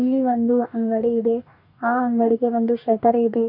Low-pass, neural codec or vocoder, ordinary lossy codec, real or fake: 5.4 kHz; codec, 44.1 kHz, 2.6 kbps, DAC; none; fake